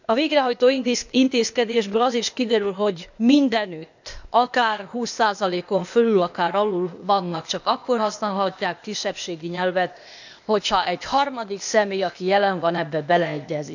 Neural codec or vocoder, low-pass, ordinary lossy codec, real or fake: codec, 16 kHz, 0.8 kbps, ZipCodec; 7.2 kHz; none; fake